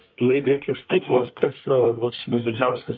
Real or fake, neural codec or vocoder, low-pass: fake; codec, 24 kHz, 1 kbps, SNAC; 7.2 kHz